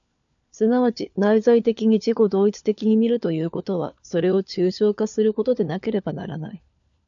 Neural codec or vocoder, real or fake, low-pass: codec, 16 kHz, 4 kbps, FunCodec, trained on LibriTTS, 50 frames a second; fake; 7.2 kHz